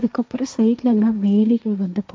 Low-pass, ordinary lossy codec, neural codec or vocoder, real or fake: none; none; codec, 16 kHz, 1.1 kbps, Voila-Tokenizer; fake